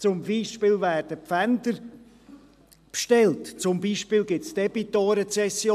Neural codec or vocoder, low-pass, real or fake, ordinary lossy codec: none; 14.4 kHz; real; AAC, 96 kbps